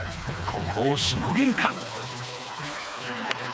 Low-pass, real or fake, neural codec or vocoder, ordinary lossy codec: none; fake; codec, 16 kHz, 2 kbps, FreqCodec, smaller model; none